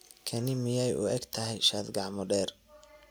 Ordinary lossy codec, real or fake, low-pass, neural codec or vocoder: none; real; none; none